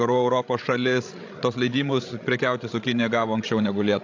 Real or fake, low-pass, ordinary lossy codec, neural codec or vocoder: fake; 7.2 kHz; AAC, 48 kbps; codec, 16 kHz, 16 kbps, FreqCodec, larger model